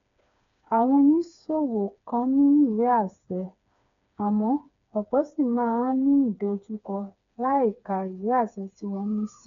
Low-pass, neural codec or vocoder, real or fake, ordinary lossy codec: 7.2 kHz; codec, 16 kHz, 4 kbps, FreqCodec, smaller model; fake; MP3, 64 kbps